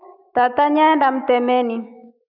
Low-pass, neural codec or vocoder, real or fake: 5.4 kHz; autoencoder, 48 kHz, 128 numbers a frame, DAC-VAE, trained on Japanese speech; fake